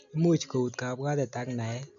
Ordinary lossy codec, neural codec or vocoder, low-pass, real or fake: none; none; 7.2 kHz; real